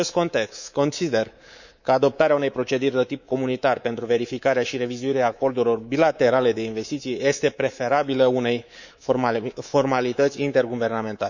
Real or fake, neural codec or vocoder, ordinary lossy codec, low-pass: fake; codec, 24 kHz, 3.1 kbps, DualCodec; none; 7.2 kHz